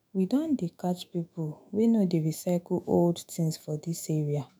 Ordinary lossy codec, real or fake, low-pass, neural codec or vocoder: none; fake; none; autoencoder, 48 kHz, 128 numbers a frame, DAC-VAE, trained on Japanese speech